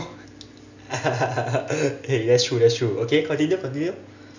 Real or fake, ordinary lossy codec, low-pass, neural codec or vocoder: real; none; 7.2 kHz; none